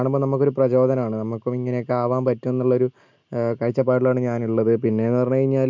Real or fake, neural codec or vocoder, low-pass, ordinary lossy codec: real; none; 7.2 kHz; MP3, 64 kbps